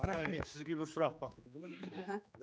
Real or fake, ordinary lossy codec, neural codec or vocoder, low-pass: fake; none; codec, 16 kHz, 2 kbps, X-Codec, HuBERT features, trained on general audio; none